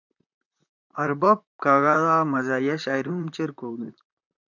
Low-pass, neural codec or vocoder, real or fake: 7.2 kHz; vocoder, 44.1 kHz, 128 mel bands, Pupu-Vocoder; fake